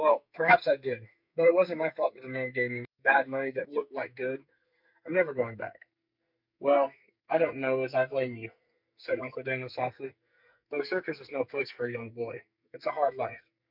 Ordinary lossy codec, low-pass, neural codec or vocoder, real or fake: MP3, 48 kbps; 5.4 kHz; codec, 44.1 kHz, 2.6 kbps, SNAC; fake